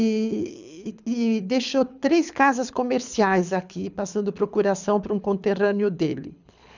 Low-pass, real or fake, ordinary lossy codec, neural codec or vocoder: 7.2 kHz; fake; none; codec, 24 kHz, 6 kbps, HILCodec